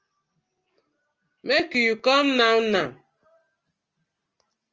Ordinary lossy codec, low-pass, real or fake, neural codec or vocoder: Opus, 24 kbps; 7.2 kHz; real; none